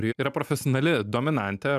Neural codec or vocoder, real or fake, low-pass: none; real; 14.4 kHz